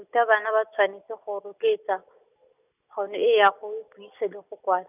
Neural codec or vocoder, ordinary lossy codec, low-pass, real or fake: none; none; 3.6 kHz; real